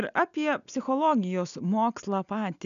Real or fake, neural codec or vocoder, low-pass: real; none; 7.2 kHz